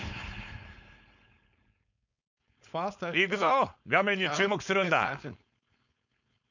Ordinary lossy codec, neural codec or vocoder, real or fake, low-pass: none; codec, 16 kHz, 4.8 kbps, FACodec; fake; 7.2 kHz